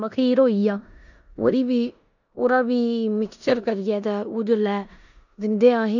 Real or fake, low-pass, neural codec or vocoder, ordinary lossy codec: fake; 7.2 kHz; codec, 16 kHz in and 24 kHz out, 0.9 kbps, LongCat-Audio-Codec, four codebook decoder; none